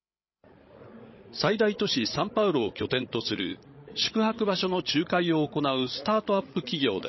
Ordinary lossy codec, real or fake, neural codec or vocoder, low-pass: MP3, 24 kbps; fake; codec, 16 kHz, 16 kbps, FreqCodec, larger model; 7.2 kHz